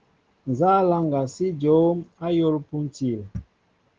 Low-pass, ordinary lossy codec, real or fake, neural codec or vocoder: 7.2 kHz; Opus, 16 kbps; real; none